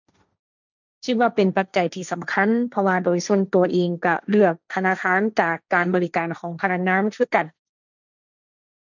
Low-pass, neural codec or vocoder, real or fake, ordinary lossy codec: 7.2 kHz; codec, 16 kHz, 1.1 kbps, Voila-Tokenizer; fake; none